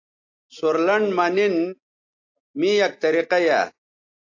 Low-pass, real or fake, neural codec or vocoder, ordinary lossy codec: 7.2 kHz; real; none; AAC, 48 kbps